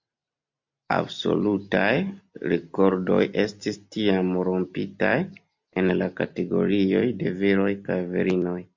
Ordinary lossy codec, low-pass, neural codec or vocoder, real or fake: MP3, 48 kbps; 7.2 kHz; none; real